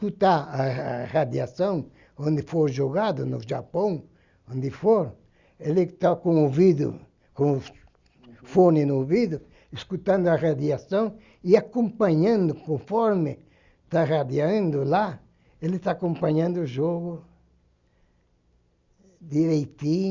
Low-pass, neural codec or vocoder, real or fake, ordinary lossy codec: 7.2 kHz; none; real; Opus, 64 kbps